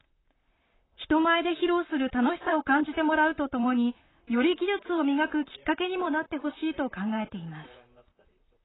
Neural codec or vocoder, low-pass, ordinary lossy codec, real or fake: vocoder, 44.1 kHz, 128 mel bands, Pupu-Vocoder; 7.2 kHz; AAC, 16 kbps; fake